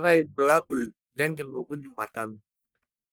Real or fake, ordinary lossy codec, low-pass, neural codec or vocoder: fake; none; none; codec, 44.1 kHz, 1.7 kbps, Pupu-Codec